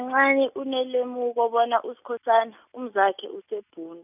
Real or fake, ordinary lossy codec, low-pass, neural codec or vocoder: real; none; 3.6 kHz; none